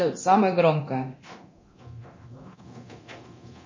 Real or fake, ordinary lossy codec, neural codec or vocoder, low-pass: fake; MP3, 32 kbps; codec, 24 kHz, 0.9 kbps, DualCodec; 7.2 kHz